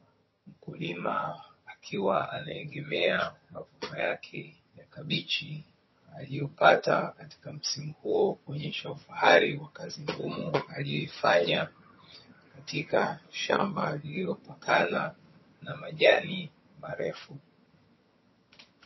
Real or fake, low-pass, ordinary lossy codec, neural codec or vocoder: fake; 7.2 kHz; MP3, 24 kbps; vocoder, 22.05 kHz, 80 mel bands, HiFi-GAN